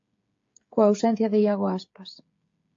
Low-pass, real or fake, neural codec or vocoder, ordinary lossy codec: 7.2 kHz; fake; codec, 16 kHz, 16 kbps, FreqCodec, smaller model; AAC, 48 kbps